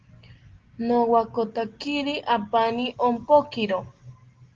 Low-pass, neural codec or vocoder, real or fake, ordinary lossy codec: 7.2 kHz; none; real; Opus, 16 kbps